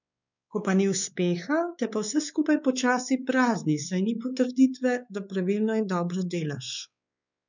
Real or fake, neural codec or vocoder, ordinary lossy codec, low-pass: fake; codec, 16 kHz, 4 kbps, X-Codec, WavLM features, trained on Multilingual LibriSpeech; none; 7.2 kHz